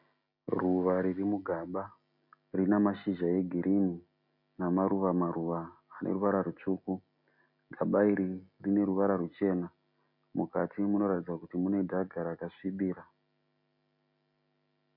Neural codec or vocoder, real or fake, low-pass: none; real; 5.4 kHz